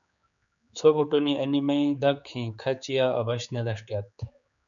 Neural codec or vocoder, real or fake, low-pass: codec, 16 kHz, 4 kbps, X-Codec, HuBERT features, trained on general audio; fake; 7.2 kHz